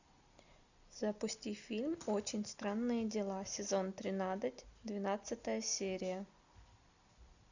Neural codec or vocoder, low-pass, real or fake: none; 7.2 kHz; real